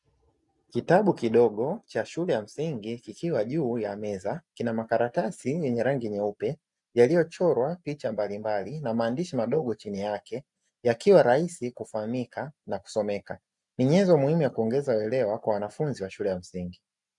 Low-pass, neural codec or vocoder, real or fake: 10.8 kHz; none; real